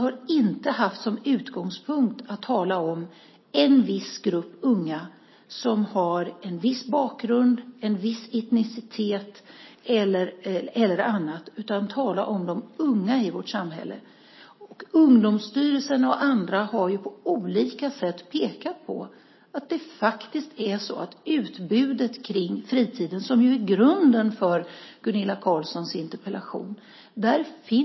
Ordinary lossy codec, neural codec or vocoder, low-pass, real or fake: MP3, 24 kbps; none; 7.2 kHz; real